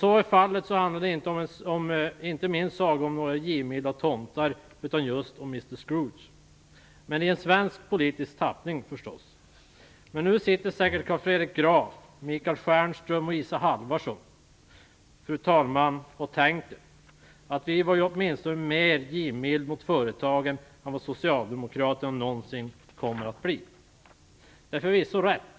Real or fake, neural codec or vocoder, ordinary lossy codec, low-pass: real; none; none; none